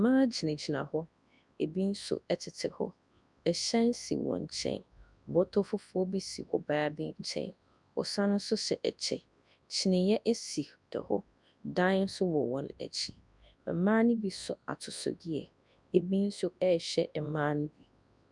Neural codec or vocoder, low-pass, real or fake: codec, 24 kHz, 0.9 kbps, WavTokenizer, large speech release; 10.8 kHz; fake